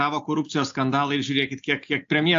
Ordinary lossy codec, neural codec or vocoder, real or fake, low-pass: AAC, 64 kbps; none; real; 7.2 kHz